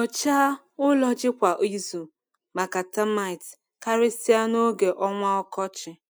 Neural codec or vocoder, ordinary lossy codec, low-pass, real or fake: none; none; none; real